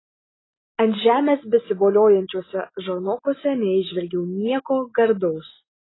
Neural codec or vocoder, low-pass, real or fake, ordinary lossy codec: none; 7.2 kHz; real; AAC, 16 kbps